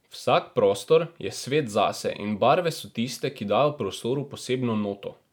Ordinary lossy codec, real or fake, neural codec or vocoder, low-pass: none; real; none; 19.8 kHz